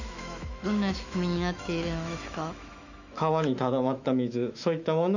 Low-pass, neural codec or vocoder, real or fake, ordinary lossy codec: 7.2 kHz; autoencoder, 48 kHz, 128 numbers a frame, DAC-VAE, trained on Japanese speech; fake; none